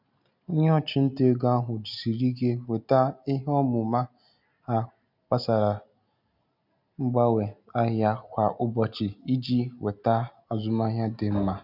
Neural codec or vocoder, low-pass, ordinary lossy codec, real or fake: none; 5.4 kHz; none; real